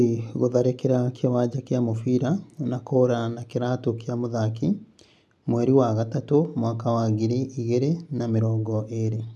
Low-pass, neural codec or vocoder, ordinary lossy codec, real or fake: none; none; none; real